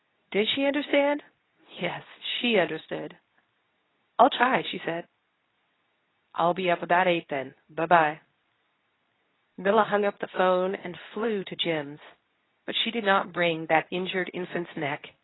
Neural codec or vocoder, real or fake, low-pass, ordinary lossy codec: codec, 24 kHz, 0.9 kbps, WavTokenizer, medium speech release version 2; fake; 7.2 kHz; AAC, 16 kbps